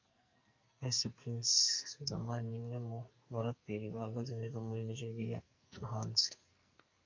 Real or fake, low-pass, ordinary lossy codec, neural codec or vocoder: fake; 7.2 kHz; MP3, 48 kbps; codec, 44.1 kHz, 2.6 kbps, SNAC